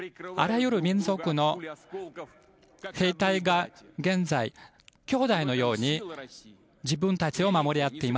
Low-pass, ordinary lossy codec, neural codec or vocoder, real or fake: none; none; none; real